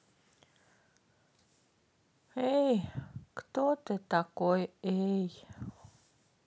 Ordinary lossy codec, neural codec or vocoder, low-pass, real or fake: none; none; none; real